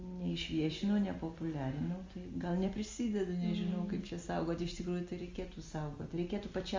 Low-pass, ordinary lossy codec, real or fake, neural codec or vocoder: 7.2 kHz; Opus, 32 kbps; real; none